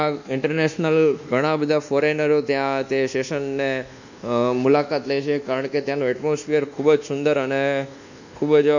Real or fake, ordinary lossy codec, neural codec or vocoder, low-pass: fake; none; codec, 24 kHz, 1.2 kbps, DualCodec; 7.2 kHz